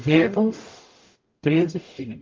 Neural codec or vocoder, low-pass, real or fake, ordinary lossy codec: codec, 44.1 kHz, 0.9 kbps, DAC; 7.2 kHz; fake; Opus, 32 kbps